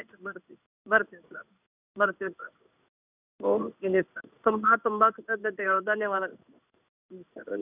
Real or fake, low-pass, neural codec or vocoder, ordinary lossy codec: fake; 3.6 kHz; codec, 16 kHz in and 24 kHz out, 1 kbps, XY-Tokenizer; none